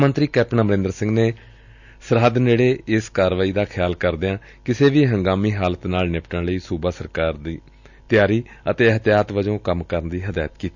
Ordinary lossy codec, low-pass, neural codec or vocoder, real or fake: none; 7.2 kHz; none; real